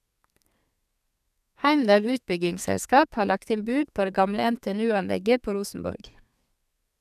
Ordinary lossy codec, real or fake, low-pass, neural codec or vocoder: none; fake; 14.4 kHz; codec, 32 kHz, 1.9 kbps, SNAC